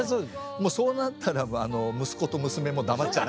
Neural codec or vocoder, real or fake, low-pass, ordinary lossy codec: none; real; none; none